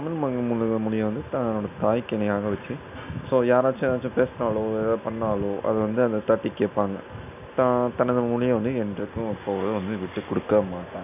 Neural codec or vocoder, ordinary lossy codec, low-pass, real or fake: none; none; 3.6 kHz; real